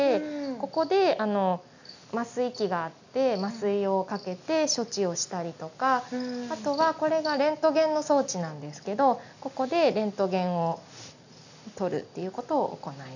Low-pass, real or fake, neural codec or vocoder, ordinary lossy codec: 7.2 kHz; real; none; none